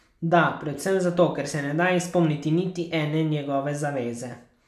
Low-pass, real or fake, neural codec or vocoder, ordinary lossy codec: 14.4 kHz; real; none; none